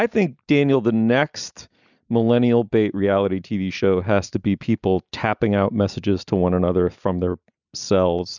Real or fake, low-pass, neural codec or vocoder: real; 7.2 kHz; none